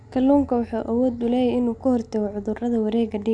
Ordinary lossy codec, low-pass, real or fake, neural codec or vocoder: none; 9.9 kHz; real; none